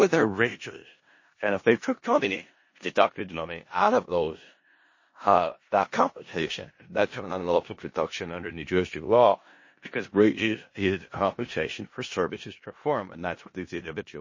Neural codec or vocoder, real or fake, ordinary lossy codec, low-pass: codec, 16 kHz in and 24 kHz out, 0.4 kbps, LongCat-Audio-Codec, four codebook decoder; fake; MP3, 32 kbps; 7.2 kHz